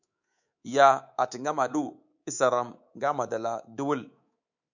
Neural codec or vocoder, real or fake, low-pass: codec, 24 kHz, 3.1 kbps, DualCodec; fake; 7.2 kHz